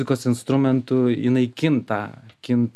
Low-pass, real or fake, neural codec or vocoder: 14.4 kHz; real; none